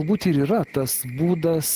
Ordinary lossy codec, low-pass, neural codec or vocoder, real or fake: Opus, 16 kbps; 14.4 kHz; none; real